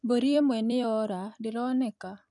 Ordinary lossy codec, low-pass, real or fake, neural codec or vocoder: none; 10.8 kHz; fake; vocoder, 44.1 kHz, 128 mel bands every 256 samples, BigVGAN v2